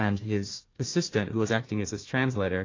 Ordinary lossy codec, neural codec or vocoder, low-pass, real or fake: AAC, 32 kbps; codec, 16 kHz, 1 kbps, FunCodec, trained on Chinese and English, 50 frames a second; 7.2 kHz; fake